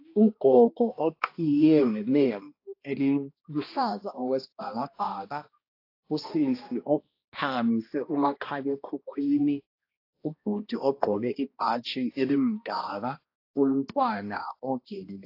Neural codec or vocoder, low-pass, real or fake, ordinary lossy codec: codec, 16 kHz, 1 kbps, X-Codec, HuBERT features, trained on balanced general audio; 5.4 kHz; fake; AAC, 32 kbps